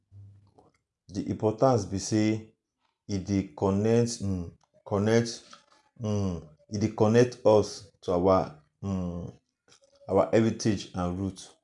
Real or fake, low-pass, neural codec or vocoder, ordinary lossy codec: real; 10.8 kHz; none; none